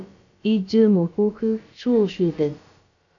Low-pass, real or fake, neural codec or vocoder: 7.2 kHz; fake; codec, 16 kHz, about 1 kbps, DyCAST, with the encoder's durations